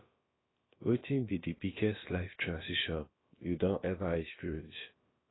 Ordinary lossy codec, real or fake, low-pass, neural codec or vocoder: AAC, 16 kbps; fake; 7.2 kHz; codec, 16 kHz, about 1 kbps, DyCAST, with the encoder's durations